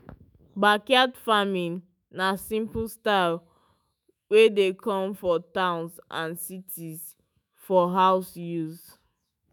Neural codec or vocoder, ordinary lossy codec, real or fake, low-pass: autoencoder, 48 kHz, 128 numbers a frame, DAC-VAE, trained on Japanese speech; none; fake; none